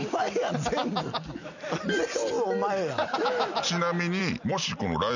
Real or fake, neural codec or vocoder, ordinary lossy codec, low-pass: real; none; none; 7.2 kHz